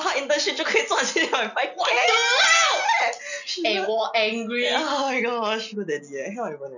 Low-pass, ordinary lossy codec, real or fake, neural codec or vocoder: 7.2 kHz; none; real; none